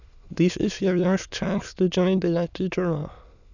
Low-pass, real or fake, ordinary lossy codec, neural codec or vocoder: 7.2 kHz; fake; none; autoencoder, 22.05 kHz, a latent of 192 numbers a frame, VITS, trained on many speakers